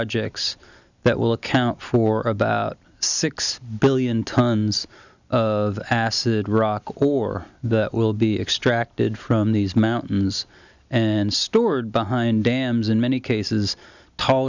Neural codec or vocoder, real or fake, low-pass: none; real; 7.2 kHz